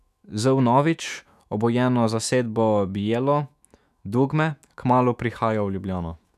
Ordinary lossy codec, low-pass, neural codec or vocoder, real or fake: none; 14.4 kHz; autoencoder, 48 kHz, 128 numbers a frame, DAC-VAE, trained on Japanese speech; fake